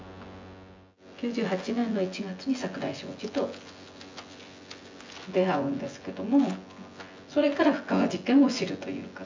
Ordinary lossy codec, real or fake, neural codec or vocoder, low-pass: MP3, 64 kbps; fake; vocoder, 24 kHz, 100 mel bands, Vocos; 7.2 kHz